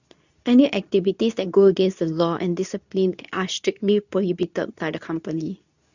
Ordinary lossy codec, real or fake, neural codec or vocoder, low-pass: none; fake; codec, 24 kHz, 0.9 kbps, WavTokenizer, medium speech release version 2; 7.2 kHz